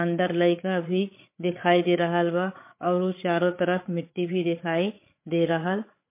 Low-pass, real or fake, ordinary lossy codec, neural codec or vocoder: 3.6 kHz; fake; MP3, 24 kbps; codec, 16 kHz, 8 kbps, FreqCodec, larger model